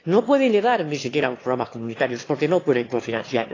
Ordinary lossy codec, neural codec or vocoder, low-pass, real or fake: AAC, 32 kbps; autoencoder, 22.05 kHz, a latent of 192 numbers a frame, VITS, trained on one speaker; 7.2 kHz; fake